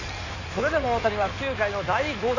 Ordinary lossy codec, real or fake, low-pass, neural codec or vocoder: none; fake; 7.2 kHz; codec, 16 kHz in and 24 kHz out, 2.2 kbps, FireRedTTS-2 codec